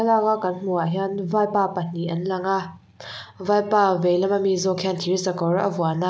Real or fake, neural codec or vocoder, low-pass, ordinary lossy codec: real; none; none; none